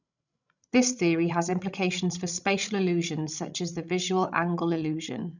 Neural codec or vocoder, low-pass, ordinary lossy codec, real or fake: codec, 16 kHz, 16 kbps, FreqCodec, larger model; 7.2 kHz; none; fake